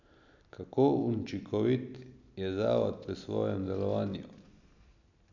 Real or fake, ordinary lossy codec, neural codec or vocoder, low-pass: real; none; none; 7.2 kHz